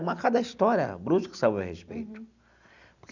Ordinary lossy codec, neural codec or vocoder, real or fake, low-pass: none; none; real; 7.2 kHz